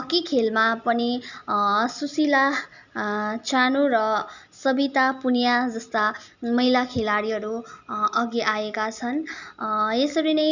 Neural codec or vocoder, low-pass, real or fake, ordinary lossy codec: none; 7.2 kHz; real; none